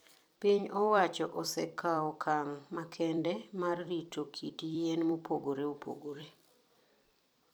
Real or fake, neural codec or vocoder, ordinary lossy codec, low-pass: fake; vocoder, 44.1 kHz, 128 mel bands every 256 samples, BigVGAN v2; none; none